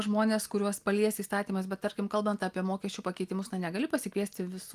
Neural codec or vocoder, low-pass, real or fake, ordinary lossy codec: none; 14.4 kHz; real; Opus, 32 kbps